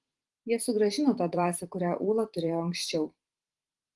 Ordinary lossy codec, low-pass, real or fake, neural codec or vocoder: Opus, 24 kbps; 10.8 kHz; fake; vocoder, 44.1 kHz, 128 mel bands every 512 samples, BigVGAN v2